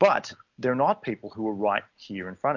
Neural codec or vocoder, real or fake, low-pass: none; real; 7.2 kHz